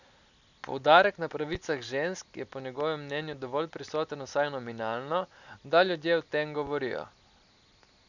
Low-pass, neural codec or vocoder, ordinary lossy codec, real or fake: 7.2 kHz; none; none; real